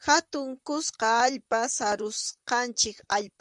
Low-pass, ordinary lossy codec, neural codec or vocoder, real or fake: 10.8 kHz; MP3, 96 kbps; vocoder, 44.1 kHz, 128 mel bands, Pupu-Vocoder; fake